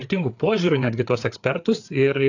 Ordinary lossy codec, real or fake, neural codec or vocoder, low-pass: AAC, 48 kbps; fake; codec, 16 kHz, 4 kbps, FreqCodec, larger model; 7.2 kHz